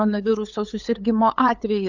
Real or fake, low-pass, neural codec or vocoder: fake; 7.2 kHz; codec, 16 kHz, 8 kbps, FunCodec, trained on LibriTTS, 25 frames a second